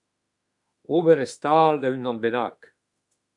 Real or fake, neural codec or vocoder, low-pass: fake; autoencoder, 48 kHz, 32 numbers a frame, DAC-VAE, trained on Japanese speech; 10.8 kHz